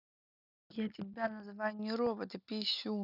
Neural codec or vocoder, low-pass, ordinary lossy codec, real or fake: none; 5.4 kHz; none; real